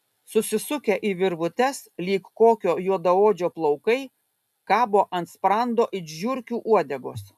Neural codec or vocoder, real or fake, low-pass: none; real; 14.4 kHz